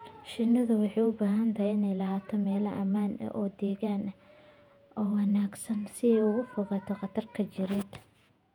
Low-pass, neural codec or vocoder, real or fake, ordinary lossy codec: 19.8 kHz; vocoder, 48 kHz, 128 mel bands, Vocos; fake; none